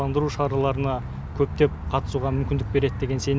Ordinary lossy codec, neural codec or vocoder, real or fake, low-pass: none; none; real; none